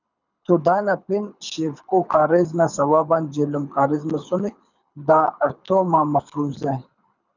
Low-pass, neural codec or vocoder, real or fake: 7.2 kHz; codec, 24 kHz, 6 kbps, HILCodec; fake